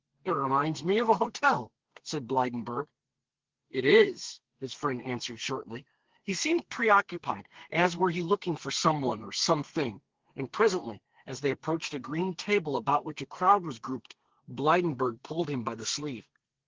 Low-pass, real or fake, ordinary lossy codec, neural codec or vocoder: 7.2 kHz; fake; Opus, 16 kbps; codec, 32 kHz, 1.9 kbps, SNAC